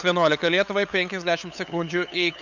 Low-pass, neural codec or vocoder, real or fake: 7.2 kHz; codec, 16 kHz, 8 kbps, FunCodec, trained on LibriTTS, 25 frames a second; fake